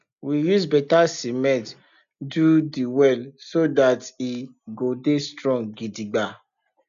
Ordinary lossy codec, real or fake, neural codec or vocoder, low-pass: none; real; none; 7.2 kHz